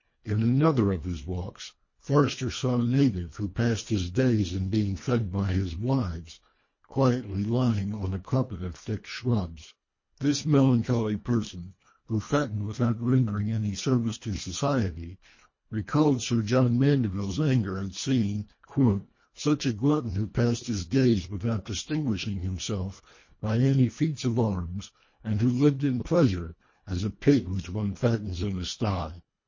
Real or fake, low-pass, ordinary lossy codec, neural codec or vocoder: fake; 7.2 kHz; MP3, 32 kbps; codec, 24 kHz, 1.5 kbps, HILCodec